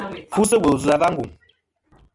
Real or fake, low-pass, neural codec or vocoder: real; 10.8 kHz; none